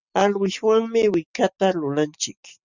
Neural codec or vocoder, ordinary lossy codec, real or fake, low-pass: none; Opus, 64 kbps; real; 7.2 kHz